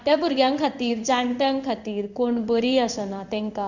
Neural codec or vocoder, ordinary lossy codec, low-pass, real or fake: codec, 16 kHz in and 24 kHz out, 1 kbps, XY-Tokenizer; none; 7.2 kHz; fake